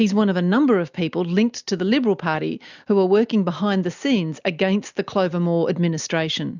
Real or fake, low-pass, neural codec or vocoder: real; 7.2 kHz; none